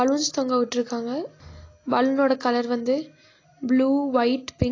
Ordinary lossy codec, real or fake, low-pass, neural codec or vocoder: AAC, 32 kbps; real; 7.2 kHz; none